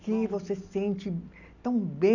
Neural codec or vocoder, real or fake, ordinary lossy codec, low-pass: none; real; none; 7.2 kHz